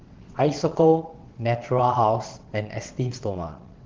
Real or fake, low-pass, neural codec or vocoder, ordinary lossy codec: fake; 7.2 kHz; vocoder, 22.05 kHz, 80 mel bands, WaveNeXt; Opus, 16 kbps